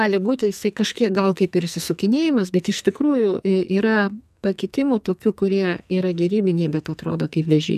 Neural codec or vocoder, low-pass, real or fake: codec, 44.1 kHz, 2.6 kbps, SNAC; 14.4 kHz; fake